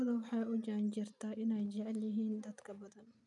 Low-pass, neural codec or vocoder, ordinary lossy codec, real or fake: 10.8 kHz; none; none; real